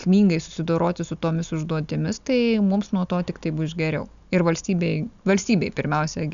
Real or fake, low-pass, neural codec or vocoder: real; 7.2 kHz; none